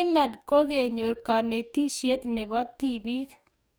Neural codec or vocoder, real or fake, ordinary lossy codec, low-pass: codec, 44.1 kHz, 2.6 kbps, DAC; fake; none; none